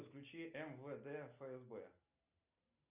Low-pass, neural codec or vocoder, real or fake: 3.6 kHz; none; real